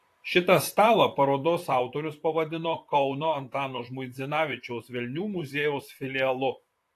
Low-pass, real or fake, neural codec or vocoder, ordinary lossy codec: 14.4 kHz; fake; vocoder, 44.1 kHz, 128 mel bands, Pupu-Vocoder; AAC, 64 kbps